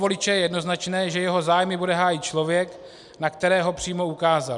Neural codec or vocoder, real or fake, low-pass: none; real; 10.8 kHz